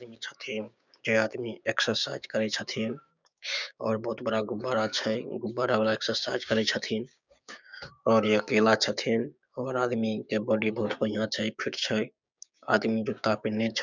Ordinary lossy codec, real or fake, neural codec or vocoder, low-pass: none; fake; codec, 16 kHz, 6 kbps, DAC; 7.2 kHz